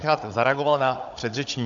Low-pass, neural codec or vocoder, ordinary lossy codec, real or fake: 7.2 kHz; codec, 16 kHz, 16 kbps, FunCodec, trained on Chinese and English, 50 frames a second; AAC, 64 kbps; fake